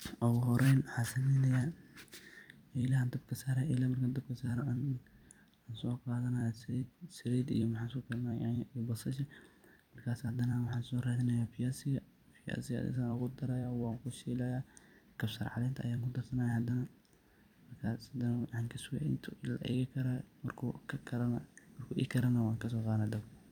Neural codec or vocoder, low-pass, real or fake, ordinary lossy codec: vocoder, 44.1 kHz, 128 mel bands every 256 samples, BigVGAN v2; 19.8 kHz; fake; none